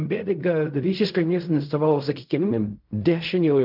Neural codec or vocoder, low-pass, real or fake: codec, 16 kHz in and 24 kHz out, 0.4 kbps, LongCat-Audio-Codec, fine tuned four codebook decoder; 5.4 kHz; fake